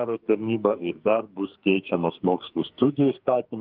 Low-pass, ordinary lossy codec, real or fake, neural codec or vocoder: 5.4 kHz; Opus, 32 kbps; fake; codec, 44.1 kHz, 2.6 kbps, SNAC